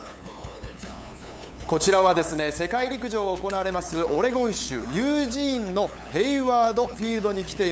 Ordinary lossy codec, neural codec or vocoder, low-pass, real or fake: none; codec, 16 kHz, 8 kbps, FunCodec, trained on LibriTTS, 25 frames a second; none; fake